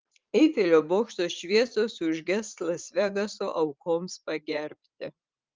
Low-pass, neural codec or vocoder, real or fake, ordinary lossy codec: 7.2 kHz; vocoder, 44.1 kHz, 80 mel bands, Vocos; fake; Opus, 32 kbps